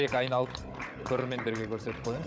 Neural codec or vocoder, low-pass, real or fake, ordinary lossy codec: codec, 16 kHz, 16 kbps, FunCodec, trained on Chinese and English, 50 frames a second; none; fake; none